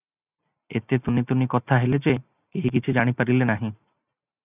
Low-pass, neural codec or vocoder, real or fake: 3.6 kHz; none; real